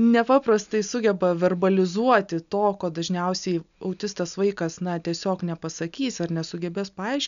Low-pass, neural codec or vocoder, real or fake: 7.2 kHz; none; real